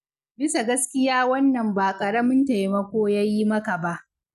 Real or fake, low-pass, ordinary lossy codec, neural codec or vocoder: real; 14.4 kHz; none; none